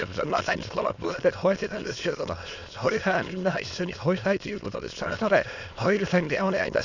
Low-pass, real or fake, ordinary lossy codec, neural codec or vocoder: 7.2 kHz; fake; none; autoencoder, 22.05 kHz, a latent of 192 numbers a frame, VITS, trained on many speakers